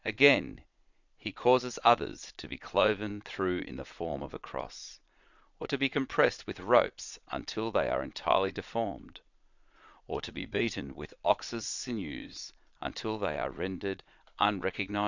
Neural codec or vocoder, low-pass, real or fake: vocoder, 22.05 kHz, 80 mel bands, Vocos; 7.2 kHz; fake